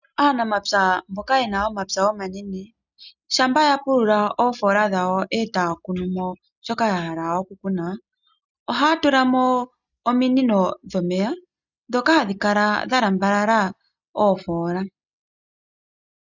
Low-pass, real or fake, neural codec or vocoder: 7.2 kHz; real; none